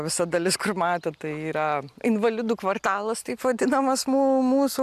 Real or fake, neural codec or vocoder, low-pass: real; none; 14.4 kHz